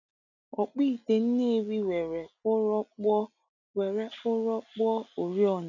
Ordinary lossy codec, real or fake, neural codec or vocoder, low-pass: none; real; none; 7.2 kHz